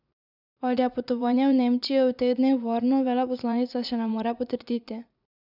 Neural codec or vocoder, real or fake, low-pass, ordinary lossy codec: vocoder, 44.1 kHz, 80 mel bands, Vocos; fake; 5.4 kHz; none